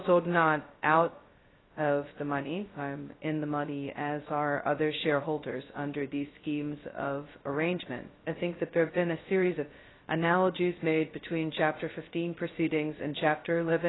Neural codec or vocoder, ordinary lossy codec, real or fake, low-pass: codec, 16 kHz, 0.2 kbps, FocalCodec; AAC, 16 kbps; fake; 7.2 kHz